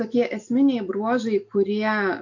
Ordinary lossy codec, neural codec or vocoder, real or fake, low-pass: AAC, 48 kbps; none; real; 7.2 kHz